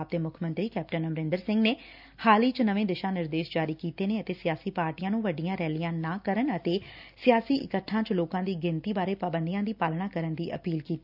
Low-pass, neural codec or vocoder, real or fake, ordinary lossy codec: 5.4 kHz; none; real; none